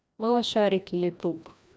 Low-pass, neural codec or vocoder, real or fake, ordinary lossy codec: none; codec, 16 kHz, 1 kbps, FreqCodec, larger model; fake; none